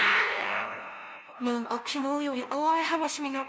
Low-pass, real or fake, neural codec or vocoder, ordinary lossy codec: none; fake; codec, 16 kHz, 0.5 kbps, FunCodec, trained on LibriTTS, 25 frames a second; none